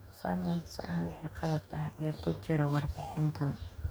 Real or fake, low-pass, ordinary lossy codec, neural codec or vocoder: fake; none; none; codec, 44.1 kHz, 2.6 kbps, DAC